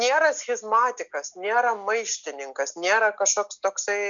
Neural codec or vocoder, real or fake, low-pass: none; real; 7.2 kHz